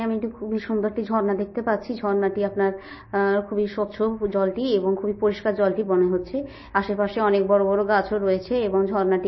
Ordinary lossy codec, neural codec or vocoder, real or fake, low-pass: MP3, 24 kbps; none; real; 7.2 kHz